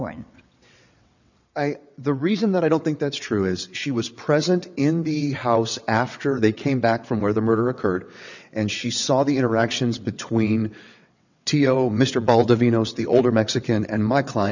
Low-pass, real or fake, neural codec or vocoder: 7.2 kHz; fake; vocoder, 22.05 kHz, 80 mel bands, WaveNeXt